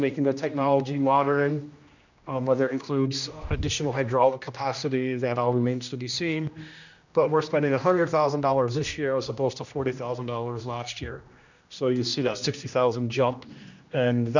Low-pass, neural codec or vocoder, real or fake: 7.2 kHz; codec, 16 kHz, 1 kbps, X-Codec, HuBERT features, trained on general audio; fake